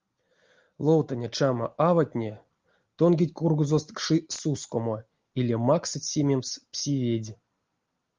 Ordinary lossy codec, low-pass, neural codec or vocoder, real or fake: Opus, 32 kbps; 7.2 kHz; none; real